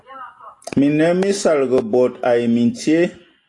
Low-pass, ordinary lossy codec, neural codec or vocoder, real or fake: 10.8 kHz; AAC, 48 kbps; none; real